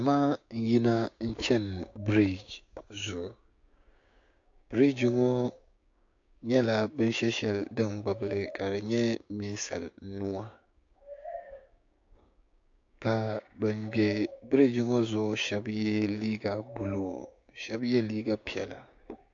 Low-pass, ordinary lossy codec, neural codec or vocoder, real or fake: 7.2 kHz; AAC, 48 kbps; codec, 16 kHz, 6 kbps, DAC; fake